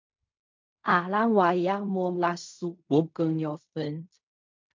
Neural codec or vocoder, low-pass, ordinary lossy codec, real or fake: codec, 16 kHz in and 24 kHz out, 0.4 kbps, LongCat-Audio-Codec, fine tuned four codebook decoder; 7.2 kHz; MP3, 48 kbps; fake